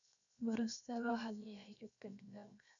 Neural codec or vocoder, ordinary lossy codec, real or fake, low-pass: codec, 16 kHz, 0.7 kbps, FocalCodec; none; fake; 7.2 kHz